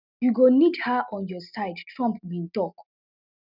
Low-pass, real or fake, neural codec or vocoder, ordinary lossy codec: 5.4 kHz; real; none; none